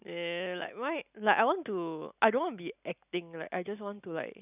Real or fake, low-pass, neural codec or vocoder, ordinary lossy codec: real; 3.6 kHz; none; none